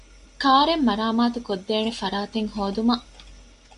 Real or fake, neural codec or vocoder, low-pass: real; none; 10.8 kHz